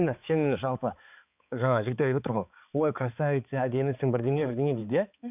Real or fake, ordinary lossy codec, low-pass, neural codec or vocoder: fake; none; 3.6 kHz; codec, 16 kHz, 4 kbps, X-Codec, HuBERT features, trained on balanced general audio